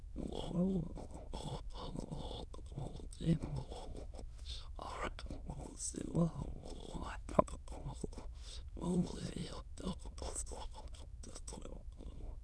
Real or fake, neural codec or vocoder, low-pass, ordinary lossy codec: fake; autoencoder, 22.05 kHz, a latent of 192 numbers a frame, VITS, trained on many speakers; none; none